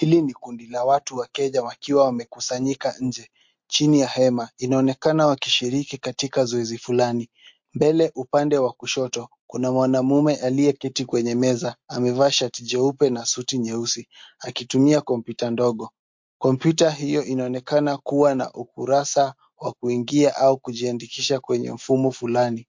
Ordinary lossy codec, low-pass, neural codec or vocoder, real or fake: MP3, 48 kbps; 7.2 kHz; none; real